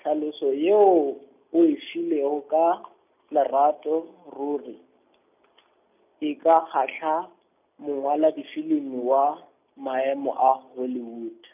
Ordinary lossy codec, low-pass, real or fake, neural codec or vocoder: none; 3.6 kHz; real; none